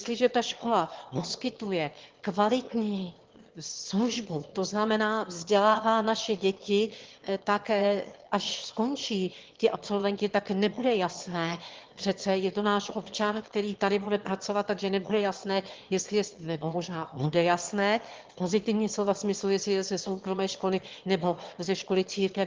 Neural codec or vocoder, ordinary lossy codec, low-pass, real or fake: autoencoder, 22.05 kHz, a latent of 192 numbers a frame, VITS, trained on one speaker; Opus, 16 kbps; 7.2 kHz; fake